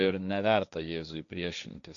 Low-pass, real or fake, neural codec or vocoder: 7.2 kHz; fake; codec, 16 kHz, 1.1 kbps, Voila-Tokenizer